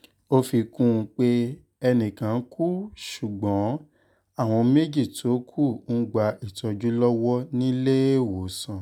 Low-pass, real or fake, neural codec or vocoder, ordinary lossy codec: 19.8 kHz; real; none; none